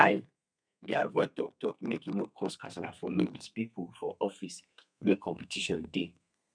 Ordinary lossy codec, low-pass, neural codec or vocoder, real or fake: none; 9.9 kHz; codec, 32 kHz, 1.9 kbps, SNAC; fake